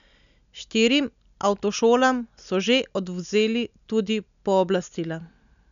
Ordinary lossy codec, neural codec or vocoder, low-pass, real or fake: none; none; 7.2 kHz; real